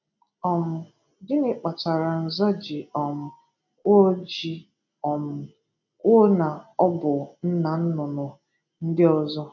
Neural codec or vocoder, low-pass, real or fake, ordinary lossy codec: none; 7.2 kHz; real; none